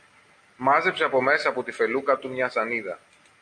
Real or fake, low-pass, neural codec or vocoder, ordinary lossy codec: real; 9.9 kHz; none; AAC, 64 kbps